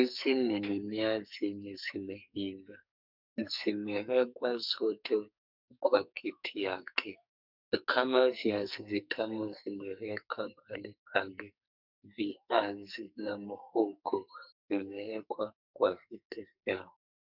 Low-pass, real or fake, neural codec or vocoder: 5.4 kHz; fake; codec, 44.1 kHz, 2.6 kbps, SNAC